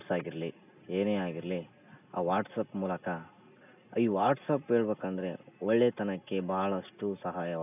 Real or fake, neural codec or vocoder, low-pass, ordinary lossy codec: real; none; 3.6 kHz; none